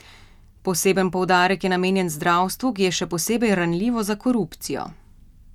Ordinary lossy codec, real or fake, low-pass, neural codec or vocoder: none; real; 19.8 kHz; none